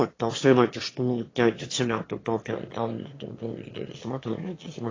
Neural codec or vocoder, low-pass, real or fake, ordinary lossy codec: autoencoder, 22.05 kHz, a latent of 192 numbers a frame, VITS, trained on one speaker; 7.2 kHz; fake; AAC, 32 kbps